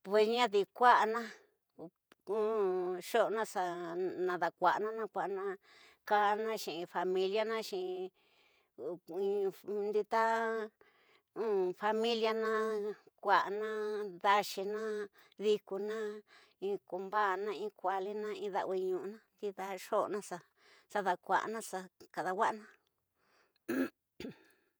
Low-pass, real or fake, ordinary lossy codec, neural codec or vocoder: none; fake; none; vocoder, 48 kHz, 128 mel bands, Vocos